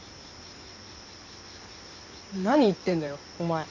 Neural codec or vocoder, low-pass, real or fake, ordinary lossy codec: codec, 16 kHz in and 24 kHz out, 2.2 kbps, FireRedTTS-2 codec; 7.2 kHz; fake; none